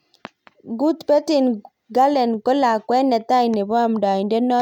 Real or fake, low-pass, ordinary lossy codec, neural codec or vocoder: real; 19.8 kHz; none; none